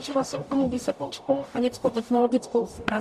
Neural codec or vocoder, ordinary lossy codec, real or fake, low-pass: codec, 44.1 kHz, 0.9 kbps, DAC; MP3, 64 kbps; fake; 14.4 kHz